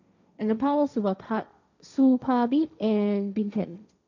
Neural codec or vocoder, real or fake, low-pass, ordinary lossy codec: codec, 16 kHz, 1.1 kbps, Voila-Tokenizer; fake; 7.2 kHz; none